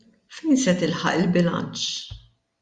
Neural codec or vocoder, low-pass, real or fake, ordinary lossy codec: none; 9.9 kHz; real; Opus, 64 kbps